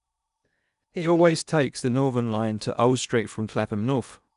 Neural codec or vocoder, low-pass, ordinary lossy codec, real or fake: codec, 16 kHz in and 24 kHz out, 0.8 kbps, FocalCodec, streaming, 65536 codes; 10.8 kHz; none; fake